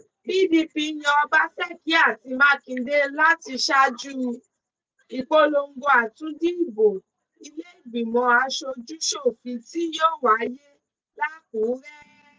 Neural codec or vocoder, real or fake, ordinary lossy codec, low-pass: none; real; none; none